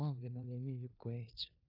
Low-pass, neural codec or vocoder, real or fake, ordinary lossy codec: 5.4 kHz; codec, 16 kHz in and 24 kHz out, 0.9 kbps, LongCat-Audio-Codec, four codebook decoder; fake; AAC, 32 kbps